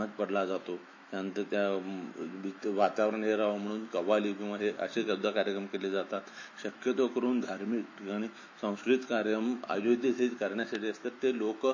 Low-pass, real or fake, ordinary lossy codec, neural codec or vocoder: 7.2 kHz; fake; MP3, 32 kbps; autoencoder, 48 kHz, 128 numbers a frame, DAC-VAE, trained on Japanese speech